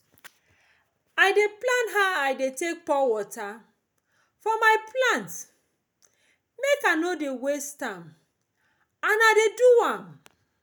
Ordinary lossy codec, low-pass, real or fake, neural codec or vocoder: none; none; real; none